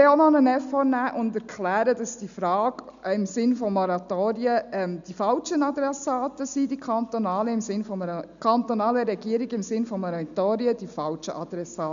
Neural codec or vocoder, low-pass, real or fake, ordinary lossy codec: none; 7.2 kHz; real; none